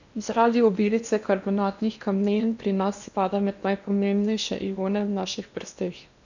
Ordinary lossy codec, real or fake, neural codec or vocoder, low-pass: none; fake; codec, 16 kHz in and 24 kHz out, 0.8 kbps, FocalCodec, streaming, 65536 codes; 7.2 kHz